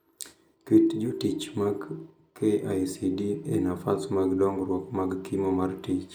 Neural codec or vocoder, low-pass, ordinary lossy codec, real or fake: none; none; none; real